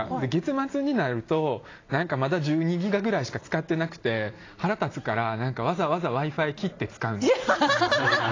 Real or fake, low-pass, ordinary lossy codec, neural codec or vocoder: real; 7.2 kHz; AAC, 32 kbps; none